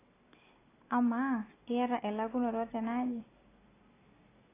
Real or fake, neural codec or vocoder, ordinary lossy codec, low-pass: real; none; AAC, 16 kbps; 3.6 kHz